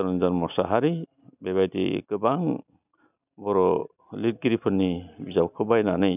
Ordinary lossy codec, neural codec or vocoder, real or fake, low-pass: none; none; real; 3.6 kHz